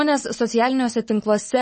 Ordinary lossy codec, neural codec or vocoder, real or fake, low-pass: MP3, 32 kbps; none; real; 10.8 kHz